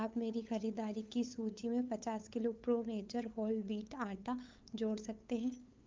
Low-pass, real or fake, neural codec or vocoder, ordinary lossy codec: 7.2 kHz; fake; codec, 16 kHz, 8 kbps, FunCodec, trained on LibriTTS, 25 frames a second; Opus, 24 kbps